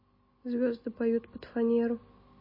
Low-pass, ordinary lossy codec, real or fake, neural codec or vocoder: 5.4 kHz; MP3, 24 kbps; real; none